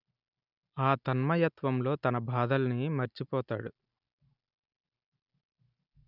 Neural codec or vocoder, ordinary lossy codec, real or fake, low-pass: none; none; real; 5.4 kHz